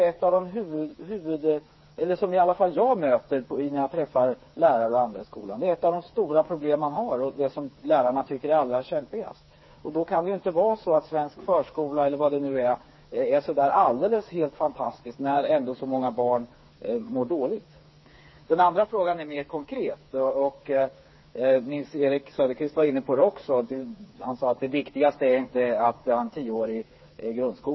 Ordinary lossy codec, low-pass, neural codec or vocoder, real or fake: MP3, 24 kbps; 7.2 kHz; codec, 16 kHz, 4 kbps, FreqCodec, smaller model; fake